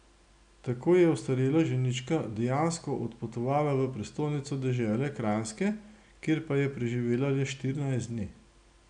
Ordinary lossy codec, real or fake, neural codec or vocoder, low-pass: none; real; none; 9.9 kHz